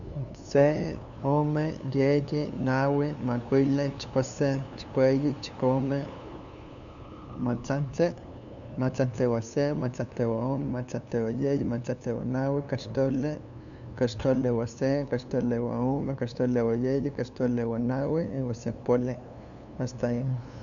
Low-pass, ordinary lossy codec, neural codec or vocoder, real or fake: 7.2 kHz; none; codec, 16 kHz, 2 kbps, FunCodec, trained on LibriTTS, 25 frames a second; fake